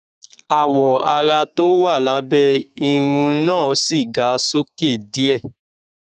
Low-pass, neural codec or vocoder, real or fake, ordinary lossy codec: 14.4 kHz; codec, 32 kHz, 1.9 kbps, SNAC; fake; none